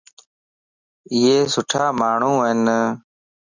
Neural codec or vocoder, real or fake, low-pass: none; real; 7.2 kHz